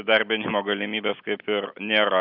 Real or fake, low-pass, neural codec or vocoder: real; 5.4 kHz; none